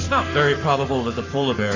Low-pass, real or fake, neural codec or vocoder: 7.2 kHz; fake; codec, 44.1 kHz, 7.8 kbps, Pupu-Codec